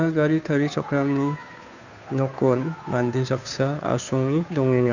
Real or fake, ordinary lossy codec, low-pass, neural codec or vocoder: fake; none; 7.2 kHz; codec, 16 kHz, 2 kbps, FunCodec, trained on Chinese and English, 25 frames a second